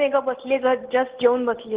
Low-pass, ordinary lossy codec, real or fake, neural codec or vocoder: 3.6 kHz; Opus, 16 kbps; real; none